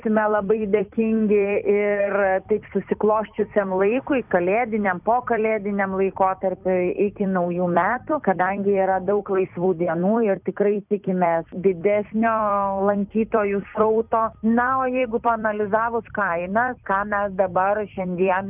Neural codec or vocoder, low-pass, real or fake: vocoder, 44.1 kHz, 128 mel bands every 256 samples, BigVGAN v2; 3.6 kHz; fake